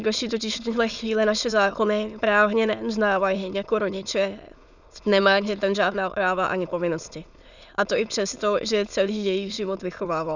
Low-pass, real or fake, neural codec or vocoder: 7.2 kHz; fake; autoencoder, 22.05 kHz, a latent of 192 numbers a frame, VITS, trained on many speakers